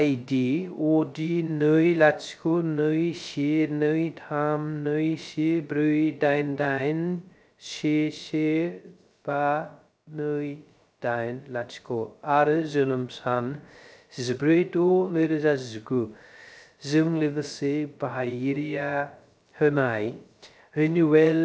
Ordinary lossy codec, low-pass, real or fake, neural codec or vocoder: none; none; fake; codec, 16 kHz, 0.3 kbps, FocalCodec